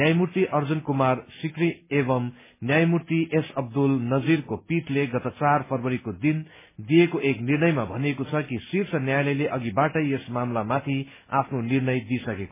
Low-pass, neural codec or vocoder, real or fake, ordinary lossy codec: 3.6 kHz; none; real; MP3, 16 kbps